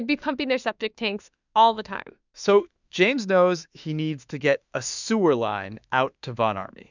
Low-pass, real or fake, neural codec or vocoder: 7.2 kHz; fake; autoencoder, 48 kHz, 32 numbers a frame, DAC-VAE, trained on Japanese speech